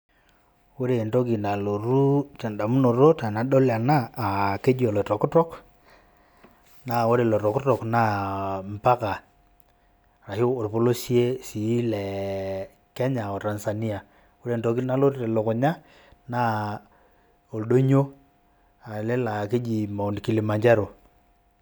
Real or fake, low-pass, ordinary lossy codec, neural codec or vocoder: real; none; none; none